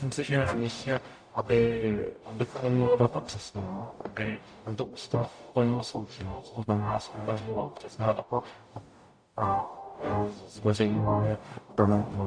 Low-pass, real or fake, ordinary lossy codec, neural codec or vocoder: 9.9 kHz; fake; AAC, 64 kbps; codec, 44.1 kHz, 0.9 kbps, DAC